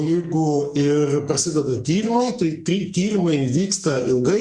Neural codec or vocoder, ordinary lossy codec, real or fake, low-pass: codec, 44.1 kHz, 2.6 kbps, SNAC; Opus, 64 kbps; fake; 9.9 kHz